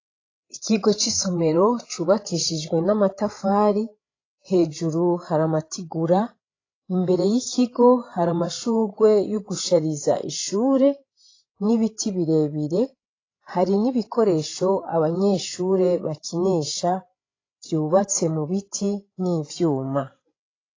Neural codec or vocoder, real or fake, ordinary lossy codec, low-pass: codec, 16 kHz, 16 kbps, FreqCodec, larger model; fake; AAC, 32 kbps; 7.2 kHz